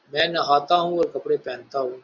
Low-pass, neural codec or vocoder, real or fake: 7.2 kHz; none; real